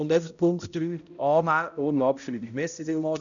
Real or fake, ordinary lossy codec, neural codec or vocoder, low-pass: fake; none; codec, 16 kHz, 0.5 kbps, X-Codec, HuBERT features, trained on balanced general audio; 7.2 kHz